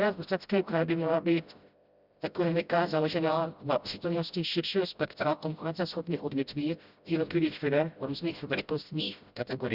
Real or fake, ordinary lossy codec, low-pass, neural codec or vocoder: fake; Opus, 64 kbps; 5.4 kHz; codec, 16 kHz, 0.5 kbps, FreqCodec, smaller model